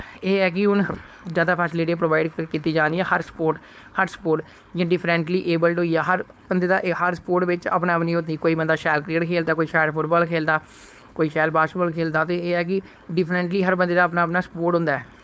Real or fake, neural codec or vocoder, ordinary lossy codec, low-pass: fake; codec, 16 kHz, 4.8 kbps, FACodec; none; none